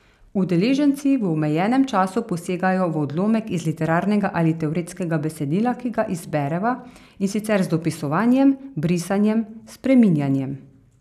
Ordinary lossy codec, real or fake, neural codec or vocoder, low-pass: none; real; none; 14.4 kHz